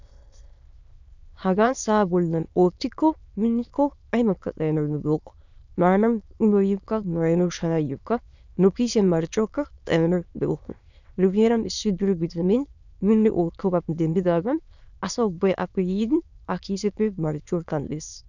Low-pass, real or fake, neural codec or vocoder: 7.2 kHz; fake; autoencoder, 22.05 kHz, a latent of 192 numbers a frame, VITS, trained on many speakers